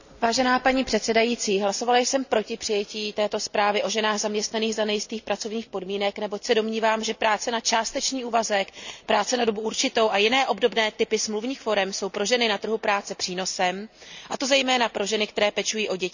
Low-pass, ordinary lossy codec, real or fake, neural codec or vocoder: 7.2 kHz; none; real; none